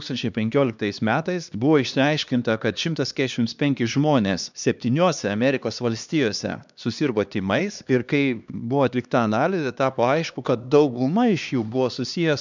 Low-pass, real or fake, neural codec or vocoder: 7.2 kHz; fake; codec, 16 kHz, 2 kbps, X-Codec, HuBERT features, trained on LibriSpeech